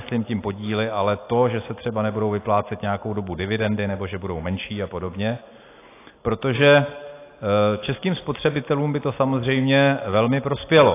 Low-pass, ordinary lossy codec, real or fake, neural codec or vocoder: 3.6 kHz; AAC, 24 kbps; real; none